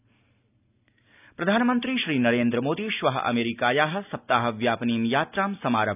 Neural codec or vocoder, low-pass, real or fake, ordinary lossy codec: none; 3.6 kHz; real; none